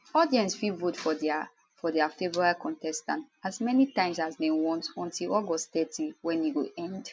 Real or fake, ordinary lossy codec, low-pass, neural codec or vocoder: real; none; none; none